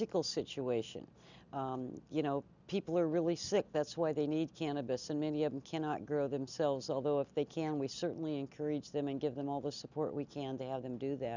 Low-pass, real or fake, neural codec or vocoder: 7.2 kHz; real; none